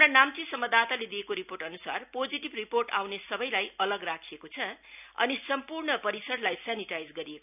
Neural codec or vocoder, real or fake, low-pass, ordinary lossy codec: none; real; 3.6 kHz; none